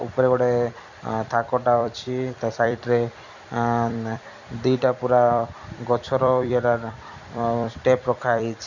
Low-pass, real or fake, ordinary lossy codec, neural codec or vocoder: 7.2 kHz; fake; none; vocoder, 44.1 kHz, 128 mel bands every 256 samples, BigVGAN v2